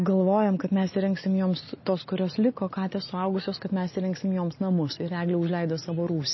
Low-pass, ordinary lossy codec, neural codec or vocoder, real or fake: 7.2 kHz; MP3, 24 kbps; none; real